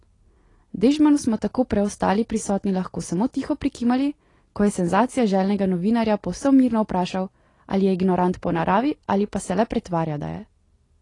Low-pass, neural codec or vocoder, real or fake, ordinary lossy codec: 10.8 kHz; none; real; AAC, 32 kbps